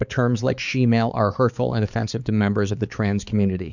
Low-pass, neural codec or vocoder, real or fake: 7.2 kHz; codec, 16 kHz, 4 kbps, X-Codec, HuBERT features, trained on balanced general audio; fake